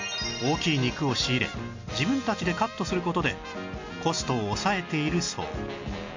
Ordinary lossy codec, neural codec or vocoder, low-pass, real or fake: MP3, 48 kbps; none; 7.2 kHz; real